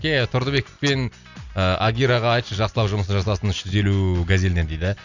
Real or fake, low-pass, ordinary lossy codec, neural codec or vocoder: real; 7.2 kHz; none; none